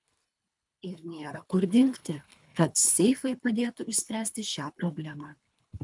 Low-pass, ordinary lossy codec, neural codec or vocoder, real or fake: 10.8 kHz; MP3, 96 kbps; codec, 24 kHz, 3 kbps, HILCodec; fake